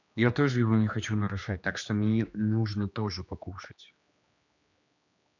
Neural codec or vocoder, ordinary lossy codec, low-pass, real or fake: codec, 16 kHz, 2 kbps, X-Codec, HuBERT features, trained on general audio; none; 7.2 kHz; fake